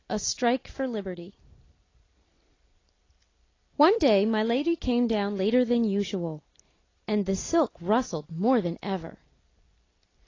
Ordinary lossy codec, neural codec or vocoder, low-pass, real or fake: AAC, 32 kbps; none; 7.2 kHz; real